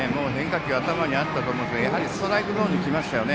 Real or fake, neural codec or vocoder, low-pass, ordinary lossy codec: real; none; none; none